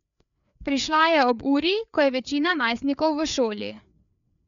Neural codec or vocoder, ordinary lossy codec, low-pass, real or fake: codec, 16 kHz, 4 kbps, FreqCodec, larger model; none; 7.2 kHz; fake